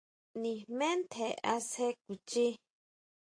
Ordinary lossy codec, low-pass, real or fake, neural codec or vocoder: AAC, 48 kbps; 9.9 kHz; real; none